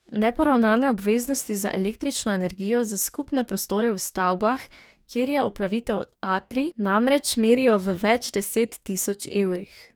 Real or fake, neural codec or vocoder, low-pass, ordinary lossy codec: fake; codec, 44.1 kHz, 2.6 kbps, DAC; none; none